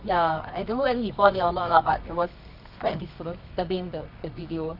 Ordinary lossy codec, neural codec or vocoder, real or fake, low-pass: none; codec, 24 kHz, 0.9 kbps, WavTokenizer, medium music audio release; fake; 5.4 kHz